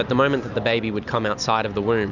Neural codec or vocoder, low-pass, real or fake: none; 7.2 kHz; real